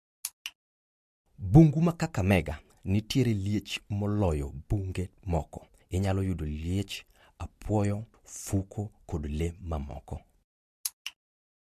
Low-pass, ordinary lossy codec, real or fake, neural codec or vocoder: 14.4 kHz; MP3, 64 kbps; real; none